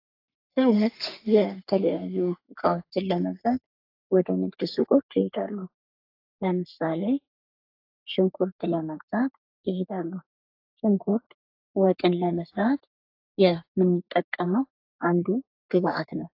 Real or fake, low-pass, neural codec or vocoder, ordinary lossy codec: fake; 5.4 kHz; codec, 44.1 kHz, 3.4 kbps, Pupu-Codec; AAC, 32 kbps